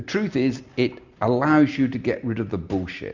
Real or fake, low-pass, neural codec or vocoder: real; 7.2 kHz; none